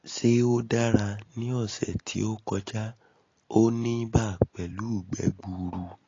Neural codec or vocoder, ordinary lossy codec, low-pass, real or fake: none; AAC, 32 kbps; 7.2 kHz; real